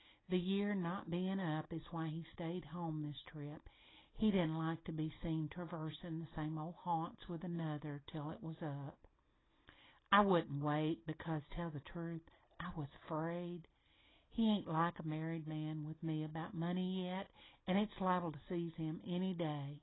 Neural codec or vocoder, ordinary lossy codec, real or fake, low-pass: none; AAC, 16 kbps; real; 7.2 kHz